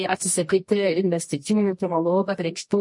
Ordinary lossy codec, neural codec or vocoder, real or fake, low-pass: MP3, 48 kbps; codec, 24 kHz, 0.9 kbps, WavTokenizer, medium music audio release; fake; 10.8 kHz